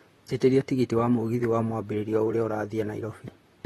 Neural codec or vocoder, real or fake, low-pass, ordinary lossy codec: vocoder, 44.1 kHz, 128 mel bands, Pupu-Vocoder; fake; 19.8 kHz; AAC, 32 kbps